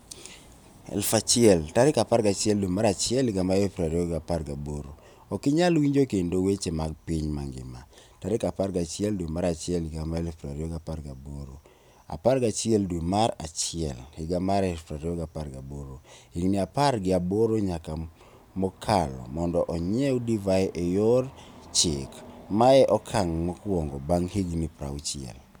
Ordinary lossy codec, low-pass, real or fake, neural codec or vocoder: none; none; real; none